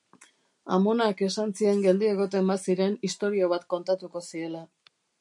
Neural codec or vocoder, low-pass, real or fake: none; 10.8 kHz; real